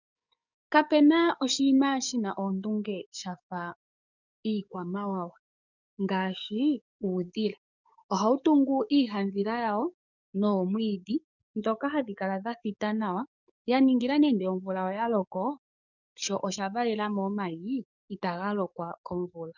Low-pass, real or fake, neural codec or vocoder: 7.2 kHz; fake; codec, 44.1 kHz, 7.8 kbps, DAC